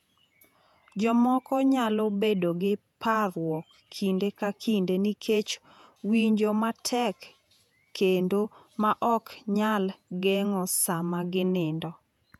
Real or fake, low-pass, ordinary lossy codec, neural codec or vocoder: fake; 19.8 kHz; none; vocoder, 48 kHz, 128 mel bands, Vocos